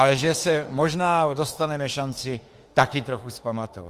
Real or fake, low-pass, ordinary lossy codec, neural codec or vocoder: fake; 14.4 kHz; Opus, 24 kbps; autoencoder, 48 kHz, 32 numbers a frame, DAC-VAE, trained on Japanese speech